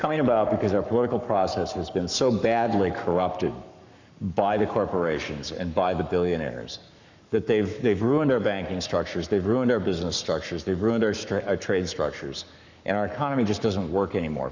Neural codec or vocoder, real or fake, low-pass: codec, 44.1 kHz, 7.8 kbps, Pupu-Codec; fake; 7.2 kHz